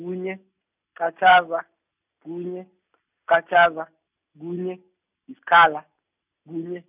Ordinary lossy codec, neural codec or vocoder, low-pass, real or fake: none; none; 3.6 kHz; real